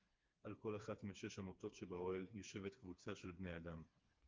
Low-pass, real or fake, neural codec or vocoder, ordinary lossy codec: 7.2 kHz; fake; codec, 16 kHz, 4 kbps, FreqCodec, smaller model; Opus, 24 kbps